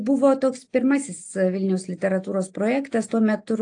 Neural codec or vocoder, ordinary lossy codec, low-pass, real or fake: none; AAC, 48 kbps; 9.9 kHz; real